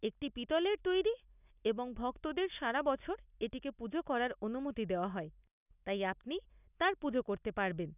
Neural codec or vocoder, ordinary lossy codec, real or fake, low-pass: none; none; real; 3.6 kHz